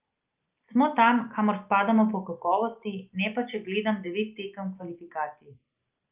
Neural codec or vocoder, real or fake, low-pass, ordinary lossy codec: none; real; 3.6 kHz; Opus, 32 kbps